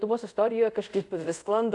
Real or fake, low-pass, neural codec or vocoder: fake; 10.8 kHz; codec, 24 kHz, 0.5 kbps, DualCodec